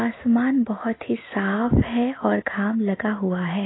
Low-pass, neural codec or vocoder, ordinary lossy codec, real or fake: 7.2 kHz; none; AAC, 16 kbps; real